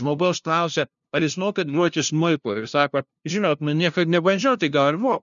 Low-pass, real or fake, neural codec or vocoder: 7.2 kHz; fake; codec, 16 kHz, 0.5 kbps, FunCodec, trained on LibriTTS, 25 frames a second